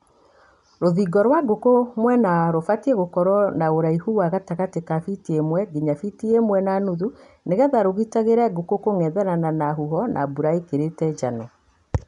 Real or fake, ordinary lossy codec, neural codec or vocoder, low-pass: real; none; none; 10.8 kHz